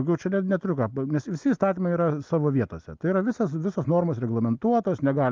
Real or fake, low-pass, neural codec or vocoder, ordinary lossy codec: real; 7.2 kHz; none; Opus, 32 kbps